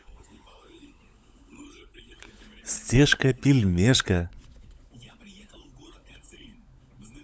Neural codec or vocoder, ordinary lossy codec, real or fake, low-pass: codec, 16 kHz, 16 kbps, FunCodec, trained on LibriTTS, 50 frames a second; none; fake; none